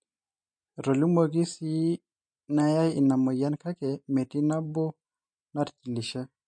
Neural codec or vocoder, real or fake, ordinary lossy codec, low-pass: none; real; MP3, 48 kbps; 9.9 kHz